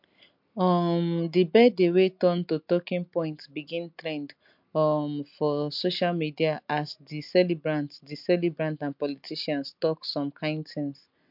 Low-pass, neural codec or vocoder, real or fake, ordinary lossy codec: 5.4 kHz; none; real; MP3, 48 kbps